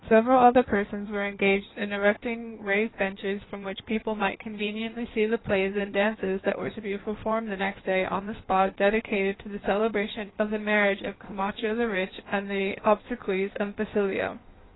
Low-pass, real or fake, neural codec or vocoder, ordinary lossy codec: 7.2 kHz; fake; codec, 16 kHz in and 24 kHz out, 1.1 kbps, FireRedTTS-2 codec; AAC, 16 kbps